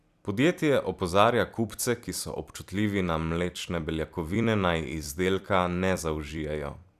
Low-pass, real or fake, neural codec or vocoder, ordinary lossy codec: 14.4 kHz; fake; vocoder, 44.1 kHz, 128 mel bands every 256 samples, BigVGAN v2; none